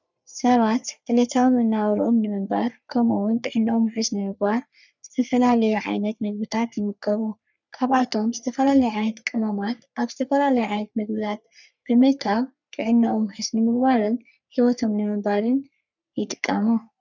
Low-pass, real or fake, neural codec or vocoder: 7.2 kHz; fake; codec, 44.1 kHz, 3.4 kbps, Pupu-Codec